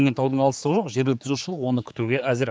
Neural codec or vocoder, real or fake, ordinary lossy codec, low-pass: codec, 16 kHz, 4 kbps, X-Codec, HuBERT features, trained on general audio; fake; none; none